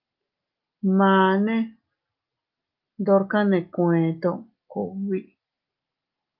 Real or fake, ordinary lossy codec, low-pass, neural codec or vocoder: real; Opus, 32 kbps; 5.4 kHz; none